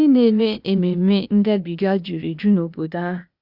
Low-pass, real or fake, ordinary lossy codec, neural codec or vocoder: 5.4 kHz; fake; Opus, 64 kbps; codec, 16 kHz, 0.8 kbps, ZipCodec